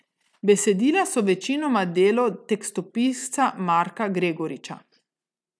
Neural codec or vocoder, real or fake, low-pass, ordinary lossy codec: none; real; none; none